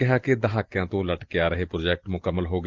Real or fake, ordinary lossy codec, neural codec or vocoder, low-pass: real; Opus, 16 kbps; none; 7.2 kHz